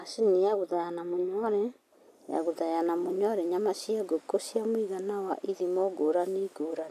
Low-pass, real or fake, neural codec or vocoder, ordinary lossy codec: 14.4 kHz; real; none; none